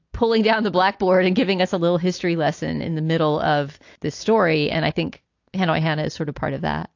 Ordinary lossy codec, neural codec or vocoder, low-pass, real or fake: AAC, 48 kbps; none; 7.2 kHz; real